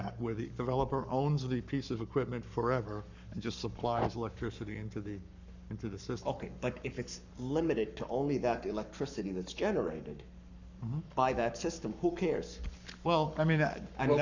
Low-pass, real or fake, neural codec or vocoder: 7.2 kHz; fake; codec, 44.1 kHz, 7.8 kbps, Pupu-Codec